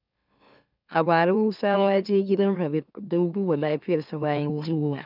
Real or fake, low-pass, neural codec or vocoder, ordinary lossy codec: fake; 5.4 kHz; autoencoder, 44.1 kHz, a latent of 192 numbers a frame, MeloTTS; none